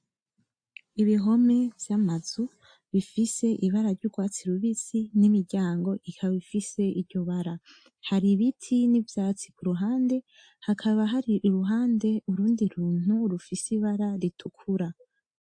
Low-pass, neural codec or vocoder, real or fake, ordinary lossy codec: 9.9 kHz; none; real; AAC, 64 kbps